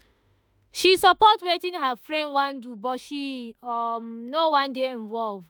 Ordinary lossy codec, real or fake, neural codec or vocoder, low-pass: none; fake; autoencoder, 48 kHz, 32 numbers a frame, DAC-VAE, trained on Japanese speech; none